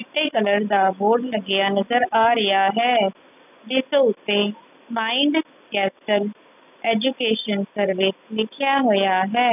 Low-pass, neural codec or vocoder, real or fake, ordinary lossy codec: 3.6 kHz; none; real; none